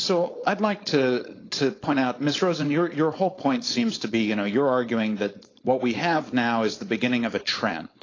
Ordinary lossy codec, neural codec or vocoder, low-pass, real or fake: AAC, 32 kbps; codec, 16 kHz, 4.8 kbps, FACodec; 7.2 kHz; fake